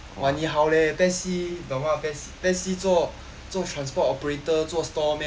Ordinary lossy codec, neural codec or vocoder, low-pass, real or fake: none; none; none; real